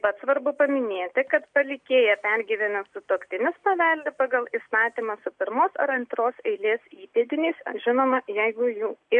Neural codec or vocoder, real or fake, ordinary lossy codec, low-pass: none; real; MP3, 64 kbps; 9.9 kHz